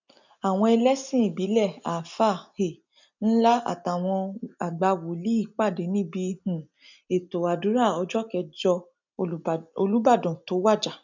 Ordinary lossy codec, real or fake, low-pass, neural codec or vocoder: none; real; 7.2 kHz; none